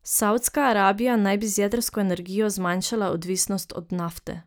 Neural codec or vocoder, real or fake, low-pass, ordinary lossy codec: none; real; none; none